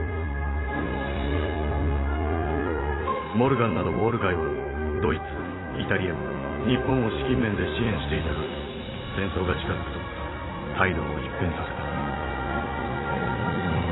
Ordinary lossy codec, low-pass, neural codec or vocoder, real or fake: AAC, 16 kbps; 7.2 kHz; vocoder, 44.1 kHz, 80 mel bands, Vocos; fake